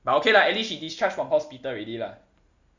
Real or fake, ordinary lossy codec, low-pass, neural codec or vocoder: real; Opus, 64 kbps; 7.2 kHz; none